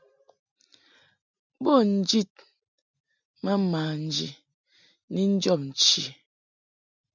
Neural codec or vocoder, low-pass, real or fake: none; 7.2 kHz; real